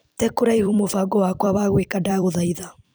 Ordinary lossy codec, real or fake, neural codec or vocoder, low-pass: none; fake; vocoder, 44.1 kHz, 128 mel bands every 256 samples, BigVGAN v2; none